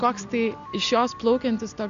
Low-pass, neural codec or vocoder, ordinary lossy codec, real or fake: 7.2 kHz; none; MP3, 96 kbps; real